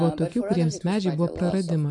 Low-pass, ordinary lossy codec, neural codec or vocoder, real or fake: 10.8 kHz; MP3, 48 kbps; none; real